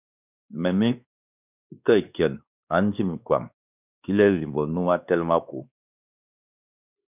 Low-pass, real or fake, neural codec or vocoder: 3.6 kHz; fake; codec, 16 kHz, 2 kbps, X-Codec, WavLM features, trained on Multilingual LibriSpeech